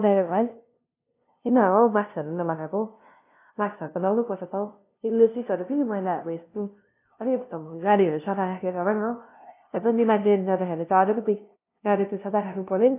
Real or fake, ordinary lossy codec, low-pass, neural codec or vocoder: fake; AAC, 24 kbps; 3.6 kHz; codec, 16 kHz, 0.5 kbps, FunCodec, trained on LibriTTS, 25 frames a second